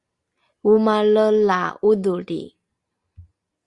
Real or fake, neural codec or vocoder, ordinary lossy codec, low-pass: real; none; Opus, 64 kbps; 10.8 kHz